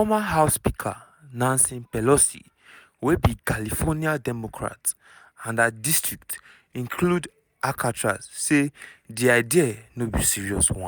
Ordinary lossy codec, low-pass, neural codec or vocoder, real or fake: none; none; none; real